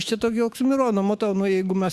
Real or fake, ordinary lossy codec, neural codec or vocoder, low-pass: fake; Opus, 64 kbps; autoencoder, 48 kHz, 128 numbers a frame, DAC-VAE, trained on Japanese speech; 14.4 kHz